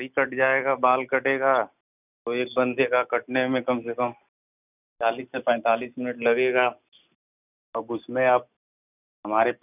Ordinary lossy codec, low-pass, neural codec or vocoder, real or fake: none; 3.6 kHz; none; real